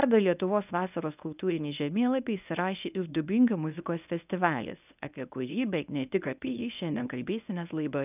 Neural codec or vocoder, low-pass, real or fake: codec, 24 kHz, 0.9 kbps, WavTokenizer, medium speech release version 1; 3.6 kHz; fake